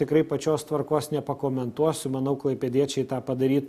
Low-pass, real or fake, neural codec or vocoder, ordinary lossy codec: 14.4 kHz; real; none; MP3, 96 kbps